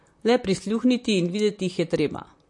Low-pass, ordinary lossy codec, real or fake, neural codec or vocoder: 10.8 kHz; MP3, 48 kbps; fake; vocoder, 44.1 kHz, 128 mel bands, Pupu-Vocoder